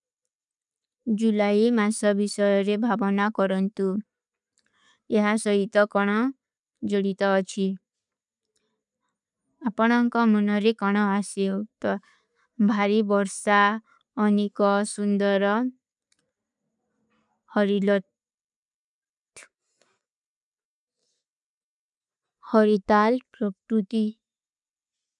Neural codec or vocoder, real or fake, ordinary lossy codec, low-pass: none; real; none; 10.8 kHz